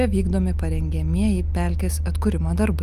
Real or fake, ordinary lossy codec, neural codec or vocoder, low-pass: real; Opus, 32 kbps; none; 14.4 kHz